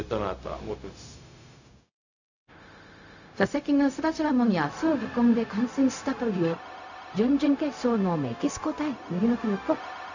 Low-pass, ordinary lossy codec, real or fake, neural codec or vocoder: 7.2 kHz; none; fake; codec, 16 kHz, 0.4 kbps, LongCat-Audio-Codec